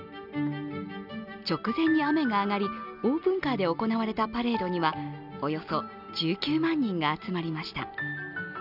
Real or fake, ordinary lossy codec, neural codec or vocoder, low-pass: real; none; none; 5.4 kHz